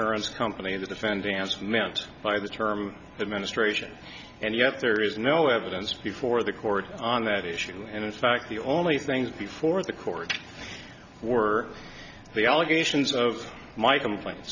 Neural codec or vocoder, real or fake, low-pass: none; real; 7.2 kHz